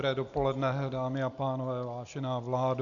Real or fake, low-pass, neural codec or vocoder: real; 7.2 kHz; none